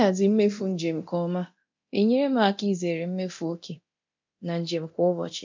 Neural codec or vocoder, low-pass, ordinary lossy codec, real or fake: codec, 24 kHz, 0.9 kbps, DualCodec; 7.2 kHz; MP3, 48 kbps; fake